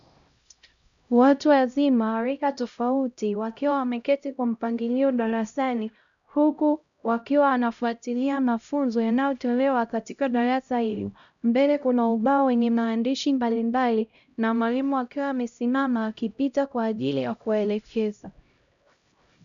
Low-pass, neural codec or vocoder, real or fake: 7.2 kHz; codec, 16 kHz, 0.5 kbps, X-Codec, HuBERT features, trained on LibriSpeech; fake